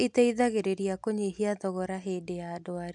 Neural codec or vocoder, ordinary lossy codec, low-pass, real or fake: none; none; 10.8 kHz; real